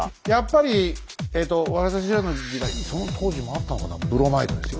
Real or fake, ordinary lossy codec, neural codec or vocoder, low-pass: real; none; none; none